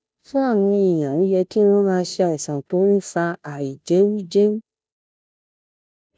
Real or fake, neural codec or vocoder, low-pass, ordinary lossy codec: fake; codec, 16 kHz, 0.5 kbps, FunCodec, trained on Chinese and English, 25 frames a second; none; none